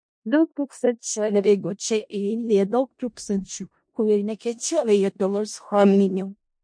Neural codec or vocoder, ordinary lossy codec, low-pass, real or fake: codec, 16 kHz in and 24 kHz out, 0.4 kbps, LongCat-Audio-Codec, four codebook decoder; MP3, 48 kbps; 9.9 kHz; fake